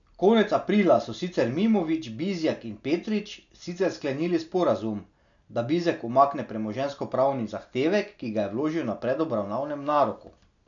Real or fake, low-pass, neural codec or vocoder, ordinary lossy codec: real; 7.2 kHz; none; none